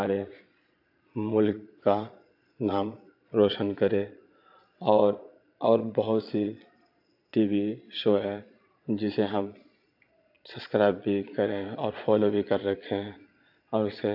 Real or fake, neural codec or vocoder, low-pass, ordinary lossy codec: fake; vocoder, 22.05 kHz, 80 mel bands, Vocos; 5.4 kHz; AAC, 48 kbps